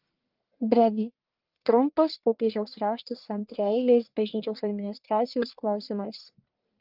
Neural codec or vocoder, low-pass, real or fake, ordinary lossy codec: codec, 44.1 kHz, 1.7 kbps, Pupu-Codec; 5.4 kHz; fake; Opus, 32 kbps